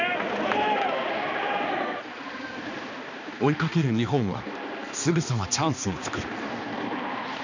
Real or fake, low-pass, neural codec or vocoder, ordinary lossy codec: fake; 7.2 kHz; codec, 16 kHz, 2 kbps, X-Codec, HuBERT features, trained on balanced general audio; none